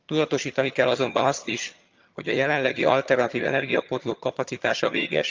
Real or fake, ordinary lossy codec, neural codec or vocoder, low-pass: fake; Opus, 24 kbps; vocoder, 22.05 kHz, 80 mel bands, HiFi-GAN; 7.2 kHz